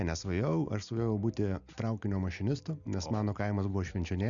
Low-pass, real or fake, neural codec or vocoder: 7.2 kHz; real; none